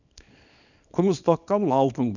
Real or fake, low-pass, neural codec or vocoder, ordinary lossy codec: fake; 7.2 kHz; codec, 24 kHz, 0.9 kbps, WavTokenizer, small release; none